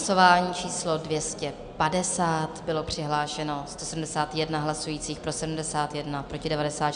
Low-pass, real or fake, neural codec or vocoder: 9.9 kHz; real; none